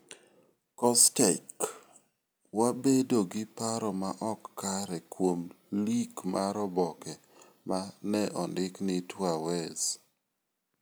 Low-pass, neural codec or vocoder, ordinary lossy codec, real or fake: none; none; none; real